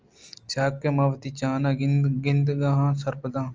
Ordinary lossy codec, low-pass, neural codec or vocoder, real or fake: Opus, 24 kbps; 7.2 kHz; none; real